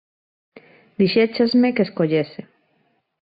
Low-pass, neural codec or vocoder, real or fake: 5.4 kHz; none; real